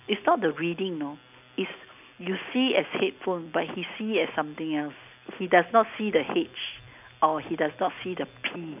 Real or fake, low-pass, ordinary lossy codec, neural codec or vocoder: real; 3.6 kHz; none; none